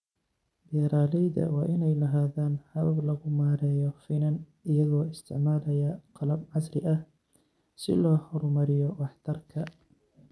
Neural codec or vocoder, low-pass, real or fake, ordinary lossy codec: none; none; real; none